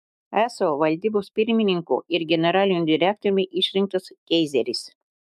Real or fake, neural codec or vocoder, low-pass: fake; autoencoder, 48 kHz, 128 numbers a frame, DAC-VAE, trained on Japanese speech; 14.4 kHz